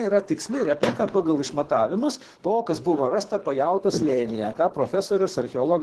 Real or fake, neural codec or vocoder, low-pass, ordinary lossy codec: fake; codec, 24 kHz, 3 kbps, HILCodec; 10.8 kHz; Opus, 16 kbps